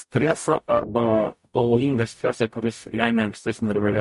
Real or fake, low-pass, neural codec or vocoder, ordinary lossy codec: fake; 14.4 kHz; codec, 44.1 kHz, 0.9 kbps, DAC; MP3, 48 kbps